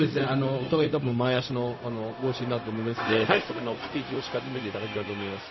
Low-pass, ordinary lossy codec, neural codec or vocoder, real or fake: 7.2 kHz; MP3, 24 kbps; codec, 16 kHz, 0.4 kbps, LongCat-Audio-Codec; fake